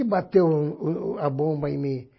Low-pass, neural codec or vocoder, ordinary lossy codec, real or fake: 7.2 kHz; none; MP3, 24 kbps; real